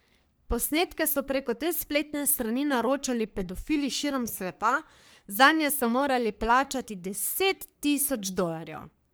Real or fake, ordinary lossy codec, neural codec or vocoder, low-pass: fake; none; codec, 44.1 kHz, 3.4 kbps, Pupu-Codec; none